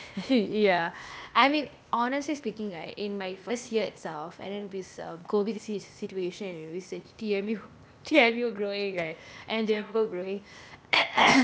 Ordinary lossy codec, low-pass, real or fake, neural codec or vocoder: none; none; fake; codec, 16 kHz, 0.8 kbps, ZipCodec